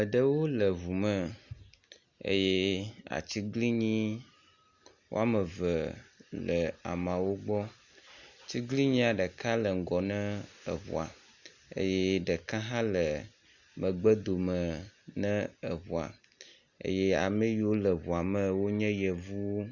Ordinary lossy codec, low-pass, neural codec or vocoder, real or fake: Opus, 64 kbps; 7.2 kHz; none; real